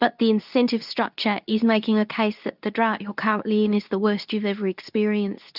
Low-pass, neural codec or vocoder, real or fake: 5.4 kHz; codec, 24 kHz, 0.9 kbps, WavTokenizer, medium speech release version 2; fake